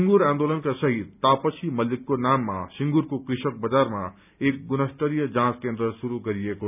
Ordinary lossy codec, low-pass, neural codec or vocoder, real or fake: none; 3.6 kHz; none; real